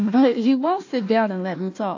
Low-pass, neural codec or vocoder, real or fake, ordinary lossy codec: 7.2 kHz; codec, 16 kHz, 1 kbps, FunCodec, trained on Chinese and English, 50 frames a second; fake; AAC, 48 kbps